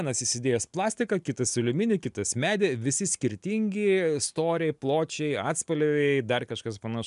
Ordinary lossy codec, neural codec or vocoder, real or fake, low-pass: MP3, 96 kbps; none; real; 10.8 kHz